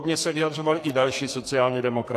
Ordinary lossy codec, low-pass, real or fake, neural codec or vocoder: AAC, 64 kbps; 14.4 kHz; fake; codec, 44.1 kHz, 2.6 kbps, SNAC